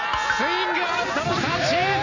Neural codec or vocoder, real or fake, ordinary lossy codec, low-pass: none; real; Opus, 64 kbps; 7.2 kHz